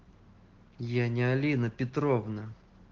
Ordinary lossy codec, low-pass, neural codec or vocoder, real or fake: Opus, 16 kbps; 7.2 kHz; none; real